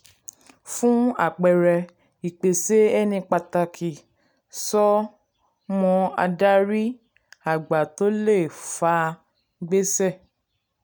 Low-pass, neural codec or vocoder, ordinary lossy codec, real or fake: none; none; none; real